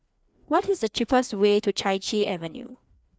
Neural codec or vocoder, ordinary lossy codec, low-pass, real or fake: codec, 16 kHz, 2 kbps, FreqCodec, larger model; none; none; fake